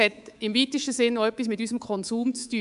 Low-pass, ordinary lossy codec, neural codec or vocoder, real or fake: 10.8 kHz; none; codec, 24 kHz, 3.1 kbps, DualCodec; fake